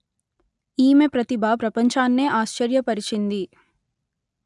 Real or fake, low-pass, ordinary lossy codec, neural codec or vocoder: real; 10.8 kHz; none; none